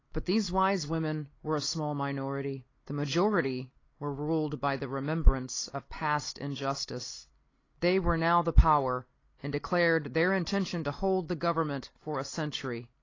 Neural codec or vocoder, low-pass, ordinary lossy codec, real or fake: none; 7.2 kHz; AAC, 32 kbps; real